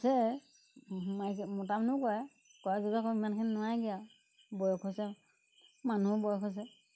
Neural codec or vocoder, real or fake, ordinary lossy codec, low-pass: none; real; none; none